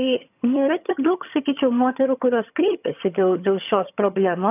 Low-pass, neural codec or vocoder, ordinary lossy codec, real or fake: 3.6 kHz; vocoder, 22.05 kHz, 80 mel bands, HiFi-GAN; AAC, 32 kbps; fake